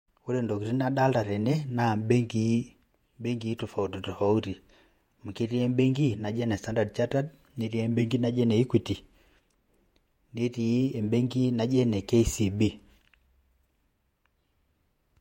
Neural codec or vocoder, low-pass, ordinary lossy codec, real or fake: vocoder, 48 kHz, 128 mel bands, Vocos; 19.8 kHz; MP3, 64 kbps; fake